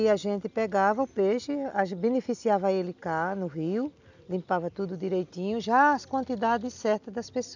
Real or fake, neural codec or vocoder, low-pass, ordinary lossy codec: real; none; 7.2 kHz; none